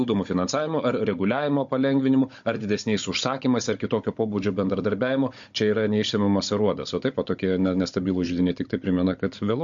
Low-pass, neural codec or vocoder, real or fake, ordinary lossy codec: 7.2 kHz; codec, 16 kHz, 16 kbps, FunCodec, trained on Chinese and English, 50 frames a second; fake; MP3, 48 kbps